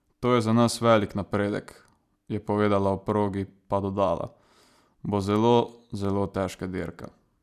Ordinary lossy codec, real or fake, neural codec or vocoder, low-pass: none; real; none; 14.4 kHz